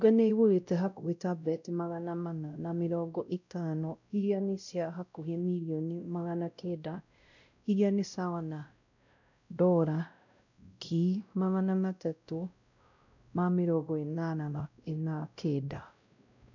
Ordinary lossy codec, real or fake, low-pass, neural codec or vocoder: none; fake; 7.2 kHz; codec, 16 kHz, 0.5 kbps, X-Codec, WavLM features, trained on Multilingual LibriSpeech